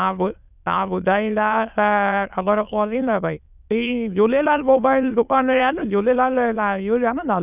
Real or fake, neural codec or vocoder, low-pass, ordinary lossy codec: fake; autoencoder, 22.05 kHz, a latent of 192 numbers a frame, VITS, trained on many speakers; 3.6 kHz; none